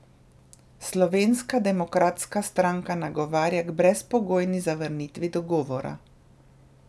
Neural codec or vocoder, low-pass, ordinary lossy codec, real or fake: none; none; none; real